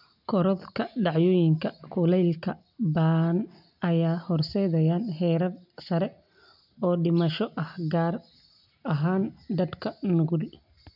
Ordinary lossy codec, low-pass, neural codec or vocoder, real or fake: none; 5.4 kHz; none; real